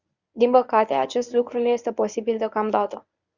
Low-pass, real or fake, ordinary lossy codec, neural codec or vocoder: 7.2 kHz; fake; Opus, 64 kbps; codec, 24 kHz, 0.9 kbps, WavTokenizer, medium speech release version 1